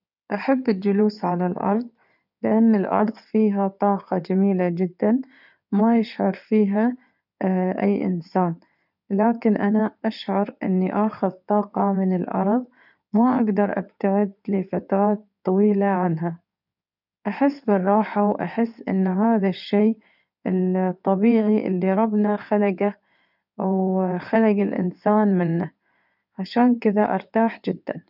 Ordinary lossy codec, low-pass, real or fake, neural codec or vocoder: none; 5.4 kHz; fake; codec, 16 kHz in and 24 kHz out, 2.2 kbps, FireRedTTS-2 codec